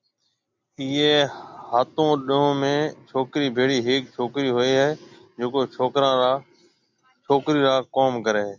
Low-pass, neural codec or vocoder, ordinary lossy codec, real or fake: 7.2 kHz; none; MP3, 64 kbps; real